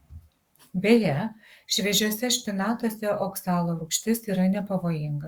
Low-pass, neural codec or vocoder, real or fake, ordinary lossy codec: 19.8 kHz; codec, 44.1 kHz, 7.8 kbps, Pupu-Codec; fake; Opus, 64 kbps